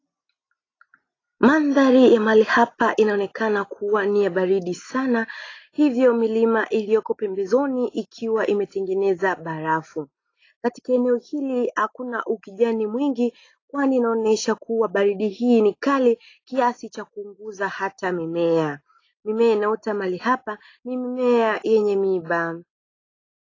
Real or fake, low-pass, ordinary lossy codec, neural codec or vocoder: real; 7.2 kHz; AAC, 32 kbps; none